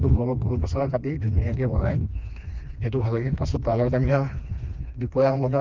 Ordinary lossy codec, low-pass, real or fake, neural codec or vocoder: Opus, 24 kbps; 7.2 kHz; fake; codec, 16 kHz, 2 kbps, FreqCodec, smaller model